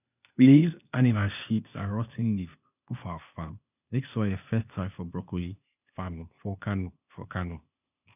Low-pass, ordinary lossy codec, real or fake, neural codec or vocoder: 3.6 kHz; none; fake; codec, 16 kHz, 0.8 kbps, ZipCodec